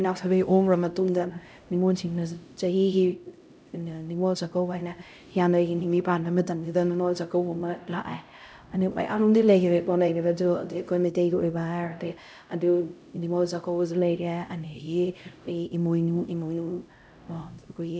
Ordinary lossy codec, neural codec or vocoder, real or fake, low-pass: none; codec, 16 kHz, 0.5 kbps, X-Codec, HuBERT features, trained on LibriSpeech; fake; none